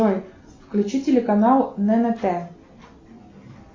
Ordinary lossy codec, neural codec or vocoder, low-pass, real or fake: AAC, 32 kbps; none; 7.2 kHz; real